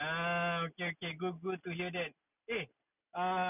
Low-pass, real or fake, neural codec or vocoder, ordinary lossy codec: 3.6 kHz; real; none; none